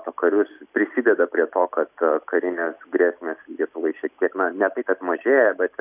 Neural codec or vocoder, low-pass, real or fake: none; 3.6 kHz; real